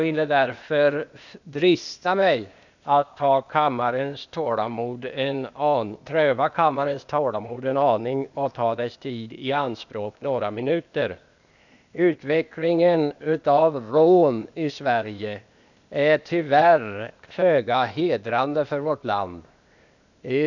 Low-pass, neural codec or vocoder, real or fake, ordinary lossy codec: 7.2 kHz; codec, 16 kHz, 0.8 kbps, ZipCodec; fake; none